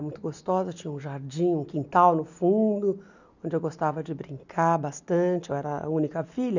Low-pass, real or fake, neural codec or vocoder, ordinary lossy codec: 7.2 kHz; real; none; none